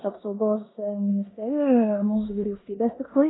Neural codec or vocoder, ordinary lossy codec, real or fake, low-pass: codec, 16 kHz in and 24 kHz out, 0.9 kbps, LongCat-Audio-Codec, four codebook decoder; AAC, 16 kbps; fake; 7.2 kHz